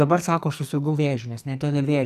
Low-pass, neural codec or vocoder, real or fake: 14.4 kHz; codec, 44.1 kHz, 2.6 kbps, SNAC; fake